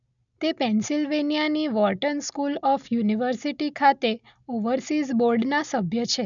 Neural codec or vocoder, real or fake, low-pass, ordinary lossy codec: none; real; 7.2 kHz; none